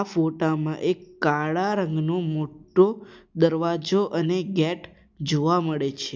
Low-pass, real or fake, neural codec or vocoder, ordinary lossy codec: none; real; none; none